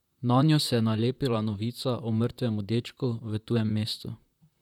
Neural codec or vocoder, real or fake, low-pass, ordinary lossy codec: vocoder, 44.1 kHz, 128 mel bands, Pupu-Vocoder; fake; 19.8 kHz; none